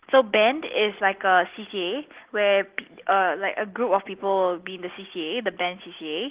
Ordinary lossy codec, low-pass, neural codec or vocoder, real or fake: Opus, 16 kbps; 3.6 kHz; none; real